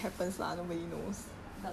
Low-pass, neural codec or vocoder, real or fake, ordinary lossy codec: 14.4 kHz; none; real; none